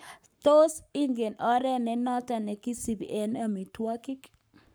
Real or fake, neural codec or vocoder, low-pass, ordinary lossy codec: fake; codec, 44.1 kHz, 7.8 kbps, Pupu-Codec; none; none